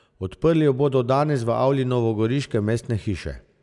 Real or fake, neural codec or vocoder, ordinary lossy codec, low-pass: real; none; none; 10.8 kHz